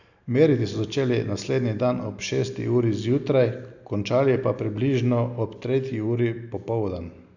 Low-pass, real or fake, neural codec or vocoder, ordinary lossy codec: 7.2 kHz; real; none; none